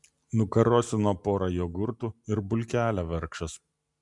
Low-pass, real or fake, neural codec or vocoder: 10.8 kHz; real; none